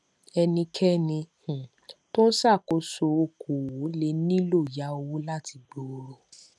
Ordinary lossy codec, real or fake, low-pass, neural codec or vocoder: none; real; none; none